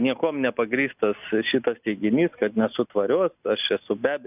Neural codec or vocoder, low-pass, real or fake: none; 3.6 kHz; real